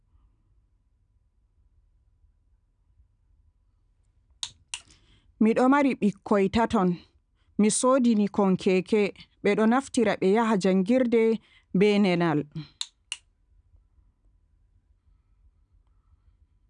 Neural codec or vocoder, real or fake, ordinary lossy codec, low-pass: none; real; none; 9.9 kHz